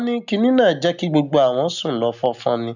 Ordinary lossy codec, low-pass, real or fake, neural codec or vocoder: none; 7.2 kHz; real; none